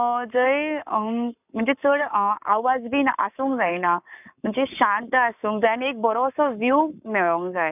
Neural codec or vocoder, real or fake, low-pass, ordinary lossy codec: codec, 44.1 kHz, 7.8 kbps, Pupu-Codec; fake; 3.6 kHz; none